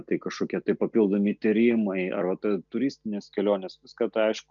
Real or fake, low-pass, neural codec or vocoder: real; 7.2 kHz; none